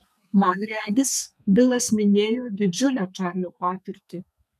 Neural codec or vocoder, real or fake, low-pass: codec, 32 kHz, 1.9 kbps, SNAC; fake; 14.4 kHz